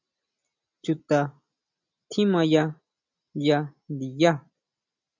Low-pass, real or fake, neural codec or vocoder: 7.2 kHz; real; none